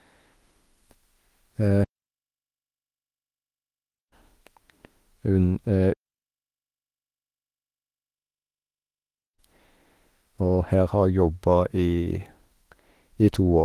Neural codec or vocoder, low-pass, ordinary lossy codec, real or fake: autoencoder, 48 kHz, 32 numbers a frame, DAC-VAE, trained on Japanese speech; 14.4 kHz; Opus, 24 kbps; fake